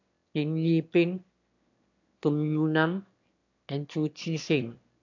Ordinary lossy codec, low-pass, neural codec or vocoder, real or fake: AAC, 48 kbps; 7.2 kHz; autoencoder, 22.05 kHz, a latent of 192 numbers a frame, VITS, trained on one speaker; fake